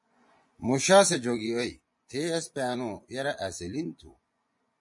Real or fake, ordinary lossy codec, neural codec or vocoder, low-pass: fake; MP3, 48 kbps; vocoder, 24 kHz, 100 mel bands, Vocos; 10.8 kHz